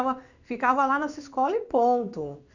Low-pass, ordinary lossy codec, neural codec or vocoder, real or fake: 7.2 kHz; none; codec, 16 kHz in and 24 kHz out, 1 kbps, XY-Tokenizer; fake